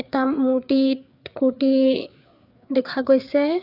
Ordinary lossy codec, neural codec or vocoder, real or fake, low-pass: none; codec, 16 kHz, 4 kbps, FreqCodec, larger model; fake; 5.4 kHz